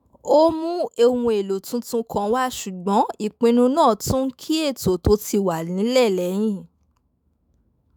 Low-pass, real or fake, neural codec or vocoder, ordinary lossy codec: none; fake; autoencoder, 48 kHz, 128 numbers a frame, DAC-VAE, trained on Japanese speech; none